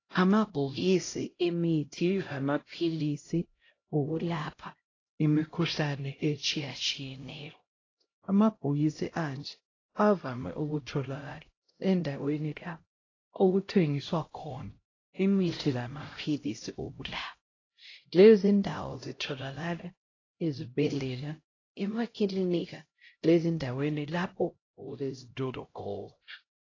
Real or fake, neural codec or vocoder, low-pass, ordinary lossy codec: fake; codec, 16 kHz, 0.5 kbps, X-Codec, HuBERT features, trained on LibriSpeech; 7.2 kHz; AAC, 32 kbps